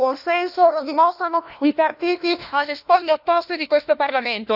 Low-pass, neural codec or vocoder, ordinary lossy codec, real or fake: 5.4 kHz; codec, 16 kHz, 1 kbps, FunCodec, trained on LibriTTS, 50 frames a second; none; fake